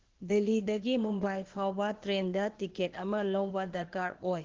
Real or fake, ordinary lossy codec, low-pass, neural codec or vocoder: fake; Opus, 16 kbps; 7.2 kHz; codec, 16 kHz, 0.8 kbps, ZipCodec